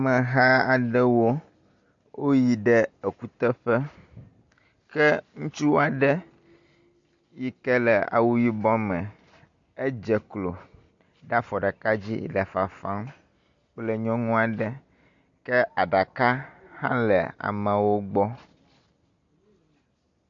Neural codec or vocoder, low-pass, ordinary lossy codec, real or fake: none; 7.2 kHz; AAC, 64 kbps; real